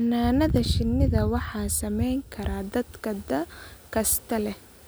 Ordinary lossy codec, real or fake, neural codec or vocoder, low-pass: none; real; none; none